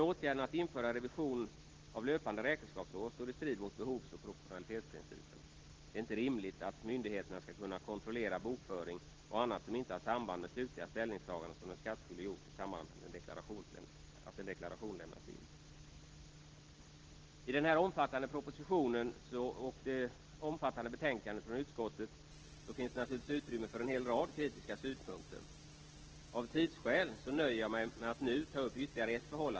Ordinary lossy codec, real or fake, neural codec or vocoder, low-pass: Opus, 16 kbps; real; none; 7.2 kHz